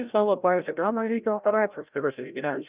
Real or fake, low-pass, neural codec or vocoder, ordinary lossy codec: fake; 3.6 kHz; codec, 16 kHz, 0.5 kbps, FreqCodec, larger model; Opus, 24 kbps